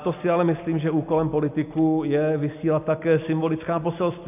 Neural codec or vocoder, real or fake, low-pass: none; real; 3.6 kHz